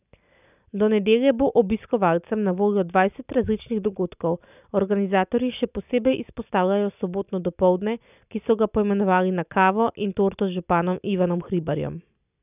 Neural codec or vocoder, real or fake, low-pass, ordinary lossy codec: none; real; 3.6 kHz; none